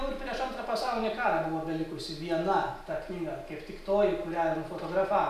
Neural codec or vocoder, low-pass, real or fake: none; 14.4 kHz; real